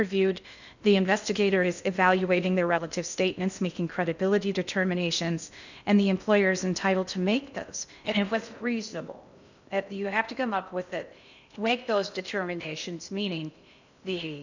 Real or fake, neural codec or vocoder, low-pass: fake; codec, 16 kHz in and 24 kHz out, 0.6 kbps, FocalCodec, streaming, 2048 codes; 7.2 kHz